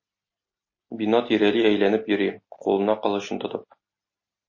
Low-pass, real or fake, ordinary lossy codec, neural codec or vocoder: 7.2 kHz; real; MP3, 32 kbps; none